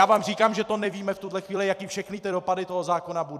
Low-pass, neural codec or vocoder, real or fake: 14.4 kHz; vocoder, 44.1 kHz, 128 mel bands every 256 samples, BigVGAN v2; fake